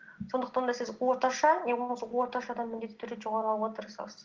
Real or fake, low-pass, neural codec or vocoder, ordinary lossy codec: real; 7.2 kHz; none; Opus, 16 kbps